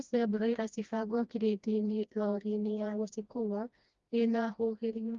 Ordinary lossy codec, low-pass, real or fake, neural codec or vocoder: Opus, 32 kbps; 7.2 kHz; fake; codec, 16 kHz, 1 kbps, FreqCodec, smaller model